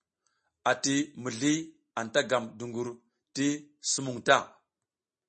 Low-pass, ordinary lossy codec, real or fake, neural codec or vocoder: 10.8 kHz; MP3, 32 kbps; real; none